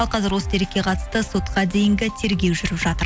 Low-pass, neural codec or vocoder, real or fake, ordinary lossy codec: none; none; real; none